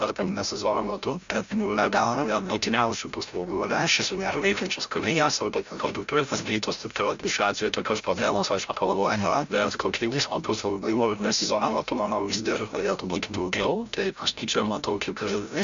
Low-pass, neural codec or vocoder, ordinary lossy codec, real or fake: 7.2 kHz; codec, 16 kHz, 0.5 kbps, FreqCodec, larger model; MP3, 64 kbps; fake